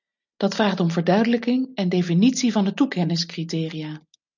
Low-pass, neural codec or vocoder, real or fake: 7.2 kHz; none; real